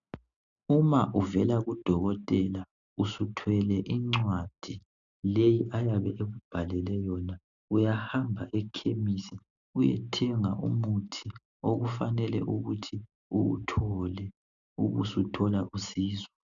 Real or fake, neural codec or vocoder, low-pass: real; none; 7.2 kHz